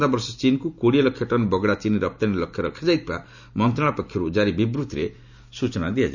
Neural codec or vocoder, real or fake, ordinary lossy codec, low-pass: none; real; none; 7.2 kHz